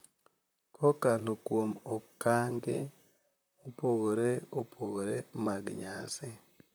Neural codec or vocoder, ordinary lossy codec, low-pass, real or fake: vocoder, 44.1 kHz, 128 mel bands, Pupu-Vocoder; none; none; fake